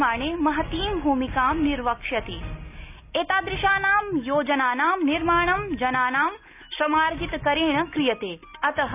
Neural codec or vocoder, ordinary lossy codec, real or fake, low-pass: none; none; real; 3.6 kHz